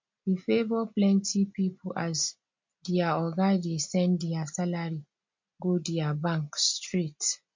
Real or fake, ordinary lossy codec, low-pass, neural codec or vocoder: real; MP3, 48 kbps; 7.2 kHz; none